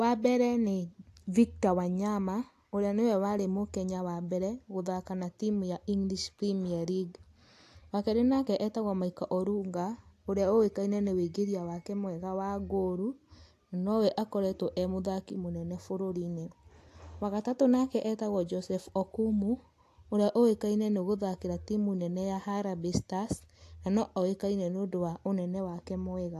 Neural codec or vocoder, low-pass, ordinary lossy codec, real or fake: none; 14.4 kHz; AAC, 64 kbps; real